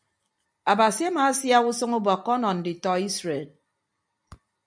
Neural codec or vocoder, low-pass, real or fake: none; 9.9 kHz; real